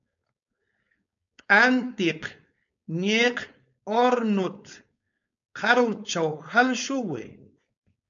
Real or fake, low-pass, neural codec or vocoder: fake; 7.2 kHz; codec, 16 kHz, 4.8 kbps, FACodec